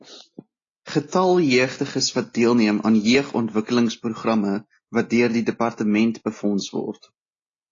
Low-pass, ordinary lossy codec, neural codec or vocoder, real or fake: 7.2 kHz; AAC, 32 kbps; none; real